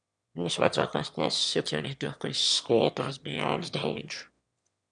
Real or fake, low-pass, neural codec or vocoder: fake; 9.9 kHz; autoencoder, 22.05 kHz, a latent of 192 numbers a frame, VITS, trained on one speaker